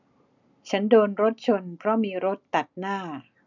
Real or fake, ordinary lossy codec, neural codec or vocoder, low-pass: real; none; none; 7.2 kHz